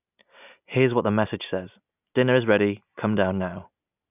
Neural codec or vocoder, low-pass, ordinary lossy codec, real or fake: none; 3.6 kHz; none; real